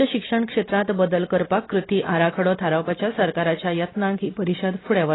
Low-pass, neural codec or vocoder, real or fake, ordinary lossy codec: 7.2 kHz; none; real; AAC, 16 kbps